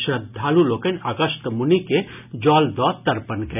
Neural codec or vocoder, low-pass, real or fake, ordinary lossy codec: none; 3.6 kHz; real; none